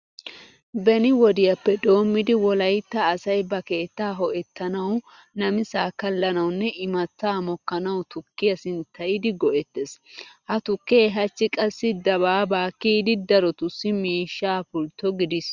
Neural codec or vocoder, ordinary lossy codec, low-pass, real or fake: none; Opus, 64 kbps; 7.2 kHz; real